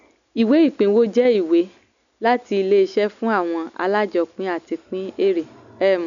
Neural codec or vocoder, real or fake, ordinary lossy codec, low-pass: none; real; none; 7.2 kHz